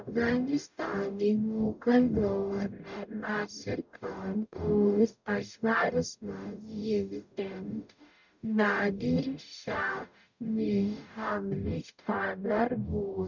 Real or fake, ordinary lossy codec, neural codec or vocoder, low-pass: fake; none; codec, 44.1 kHz, 0.9 kbps, DAC; 7.2 kHz